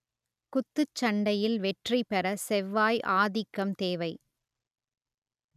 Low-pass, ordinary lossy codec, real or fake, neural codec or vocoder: 14.4 kHz; none; real; none